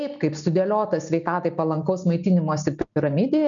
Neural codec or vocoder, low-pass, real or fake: none; 7.2 kHz; real